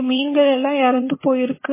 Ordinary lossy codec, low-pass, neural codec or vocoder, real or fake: MP3, 16 kbps; 3.6 kHz; vocoder, 22.05 kHz, 80 mel bands, HiFi-GAN; fake